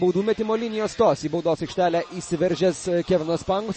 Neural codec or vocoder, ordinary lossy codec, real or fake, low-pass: none; MP3, 32 kbps; real; 10.8 kHz